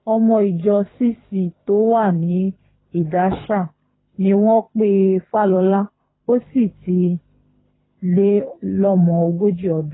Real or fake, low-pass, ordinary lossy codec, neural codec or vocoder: fake; 7.2 kHz; AAC, 16 kbps; codec, 24 kHz, 3 kbps, HILCodec